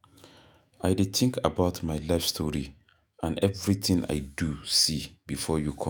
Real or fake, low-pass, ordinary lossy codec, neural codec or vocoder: fake; none; none; autoencoder, 48 kHz, 128 numbers a frame, DAC-VAE, trained on Japanese speech